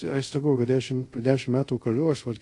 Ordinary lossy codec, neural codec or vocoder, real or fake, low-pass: AAC, 48 kbps; codec, 24 kHz, 0.5 kbps, DualCodec; fake; 10.8 kHz